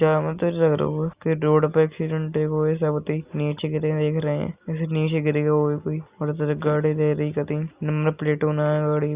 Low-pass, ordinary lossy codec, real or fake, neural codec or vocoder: 3.6 kHz; Opus, 64 kbps; real; none